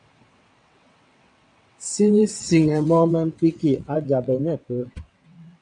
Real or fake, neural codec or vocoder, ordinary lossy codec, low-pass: fake; vocoder, 22.05 kHz, 80 mel bands, WaveNeXt; MP3, 96 kbps; 9.9 kHz